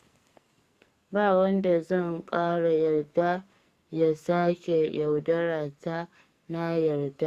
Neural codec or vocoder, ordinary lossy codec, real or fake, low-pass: codec, 44.1 kHz, 2.6 kbps, SNAC; Opus, 64 kbps; fake; 14.4 kHz